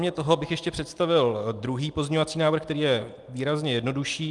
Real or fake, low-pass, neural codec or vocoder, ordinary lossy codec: real; 10.8 kHz; none; Opus, 32 kbps